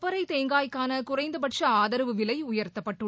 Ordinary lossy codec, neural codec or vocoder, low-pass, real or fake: none; none; none; real